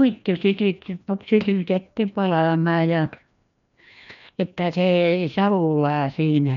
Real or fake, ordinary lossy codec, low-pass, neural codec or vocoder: fake; none; 7.2 kHz; codec, 16 kHz, 1 kbps, FreqCodec, larger model